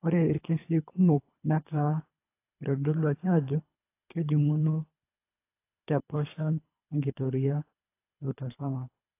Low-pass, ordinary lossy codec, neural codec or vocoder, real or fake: 3.6 kHz; AAC, 24 kbps; codec, 24 kHz, 3 kbps, HILCodec; fake